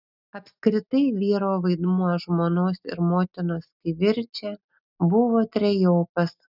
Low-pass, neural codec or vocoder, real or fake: 5.4 kHz; none; real